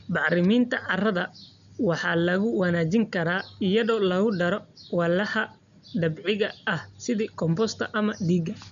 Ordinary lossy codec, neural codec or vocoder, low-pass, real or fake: none; none; 7.2 kHz; real